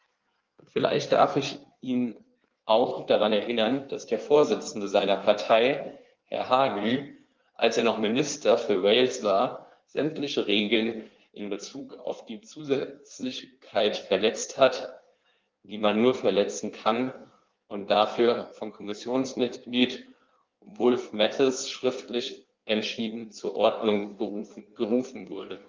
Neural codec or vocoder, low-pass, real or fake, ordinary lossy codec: codec, 16 kHz in and 24 kHz out, 1.1 kbps, FireRedTTS-2 codec; 7.2 kHz; fake; Opus, 32 kbps